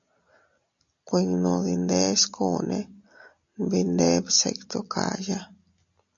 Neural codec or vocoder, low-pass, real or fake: none; 7.2 kHz; real